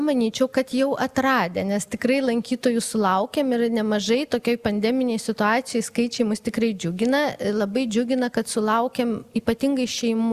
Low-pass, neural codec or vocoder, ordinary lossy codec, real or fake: 14.4 kHz; none; Opus, 64 kbps; real